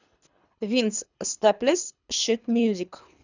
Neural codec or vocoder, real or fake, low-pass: codec, 24 kHz, 3 kbps, HILCodec; fake; 7.2 kHz